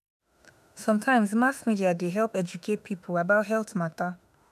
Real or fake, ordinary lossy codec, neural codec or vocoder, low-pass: fake; none; autoencoder, 48 kHz, 32 numbers a frame, DAC-VAE, trained on Japanese speech; 14.4 kHz